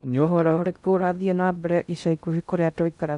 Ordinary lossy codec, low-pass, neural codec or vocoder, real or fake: none; 10.8 kHz; codec, 16 kHz in and 24 kHz out, 0.6 kbps, FocalCodec, streaming, 2048 codes; fake